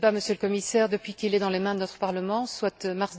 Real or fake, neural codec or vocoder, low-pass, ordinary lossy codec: real; none; none; none